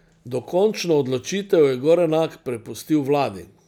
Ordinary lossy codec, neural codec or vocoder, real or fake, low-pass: none; none; real; 19.8 kHz